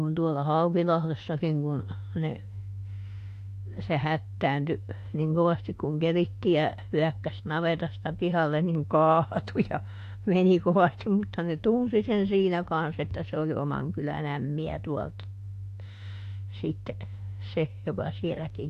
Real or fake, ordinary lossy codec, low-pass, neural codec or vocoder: fake; AAC, 64 kbps; 14.4 kHz; autoencoder, 48 kHz, 32 numbers a frame, DAC-VAE, trained on Japanese speech